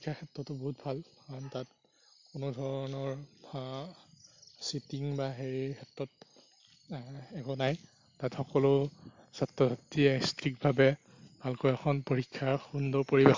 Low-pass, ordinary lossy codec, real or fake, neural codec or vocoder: 7.2 kHz; MP3, 48 kbps; real; none